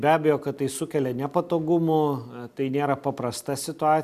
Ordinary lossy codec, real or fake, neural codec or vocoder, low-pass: MP3, 96 kbps; real; none; 14.4 kHz